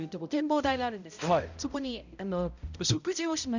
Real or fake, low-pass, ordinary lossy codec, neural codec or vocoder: fake; 7.2 kHz; none; codec, 16 kHz, 0.5 kbps, X-Codec, HuBERT features, trained on balanced general audio